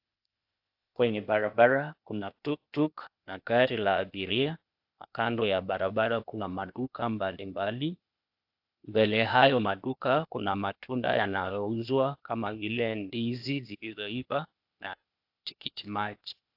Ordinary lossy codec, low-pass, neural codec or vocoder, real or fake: AAC, 48 kbps; 5.4 kHz; codec, 16 kHz, 0.8 kbps, ZipCodec; fake